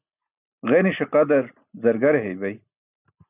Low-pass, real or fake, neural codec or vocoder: 3.6 kHz; real; none